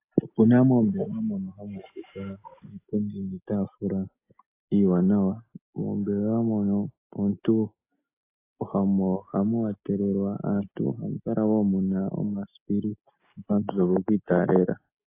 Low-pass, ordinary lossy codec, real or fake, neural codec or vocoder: 3.6 kHz; AAC, 24 kbps; real; none